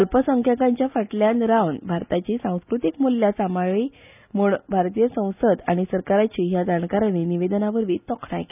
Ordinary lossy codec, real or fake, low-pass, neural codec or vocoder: none; real; 3.6 kHz; none